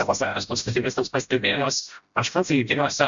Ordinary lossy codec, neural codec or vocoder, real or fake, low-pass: MP3, 64 kbps; codec, 16 kHz, 0.5 kbps, FreqCodec, smaller model; fake; 7.2 kHz